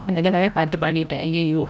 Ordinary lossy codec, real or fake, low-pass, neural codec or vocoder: none; fake; none; codec, 16 kHz, 0.5 kbps, FreqCodec, larger model